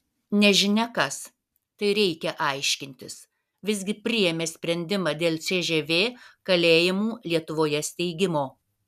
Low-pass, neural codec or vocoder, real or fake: 14.4 kHz; none; real